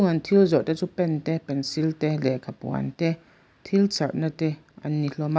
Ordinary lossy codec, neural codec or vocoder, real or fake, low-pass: none; none; real; none